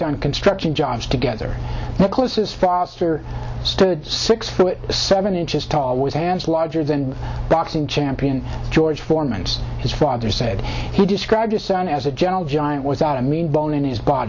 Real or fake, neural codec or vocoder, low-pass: real; none; 7.2 kHz